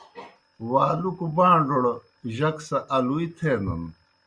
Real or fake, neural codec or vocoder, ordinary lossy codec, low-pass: real; none; Opus, 64 kbps; 9.9 kHz